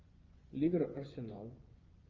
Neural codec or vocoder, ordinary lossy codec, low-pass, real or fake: vocoder, 24 kHz, 100 mel bands, Vocos; Opus, 32 kbps; 7.2 kHz; fake